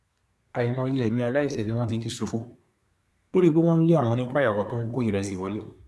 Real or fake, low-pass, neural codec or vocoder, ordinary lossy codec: fake; none; codec, 24 kHz, 1 kbps, SNAC; none